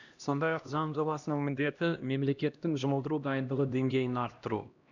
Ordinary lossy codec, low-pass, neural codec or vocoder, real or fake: none; 7.2 kHz; codec, 16 kHz, 1 kbps, X-Codec, HuBERT features, trained on LibriSpeech; fake